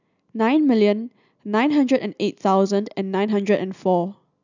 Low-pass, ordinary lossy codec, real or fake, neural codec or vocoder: 7.2 kHz; none; real; none